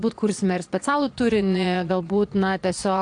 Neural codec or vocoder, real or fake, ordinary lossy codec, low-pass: vocoder, 22.05 kHz, 80 mel bands, Vocos; fake; AAC, 64 kbps; 9.9 kHz